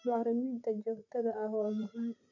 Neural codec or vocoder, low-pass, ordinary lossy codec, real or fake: vocoder, 44.1 kHz, 128 mel bands, Pupu-Vocoder; 7.2 kHz; AAC, 48 kbps; fake